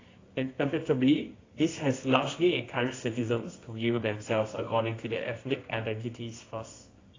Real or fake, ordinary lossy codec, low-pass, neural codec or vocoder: fake; AAC, 32 kbps; 7.2 kHz; codec, 24 kHz, 0.9 kbps, WavTokenizer, medium music audio release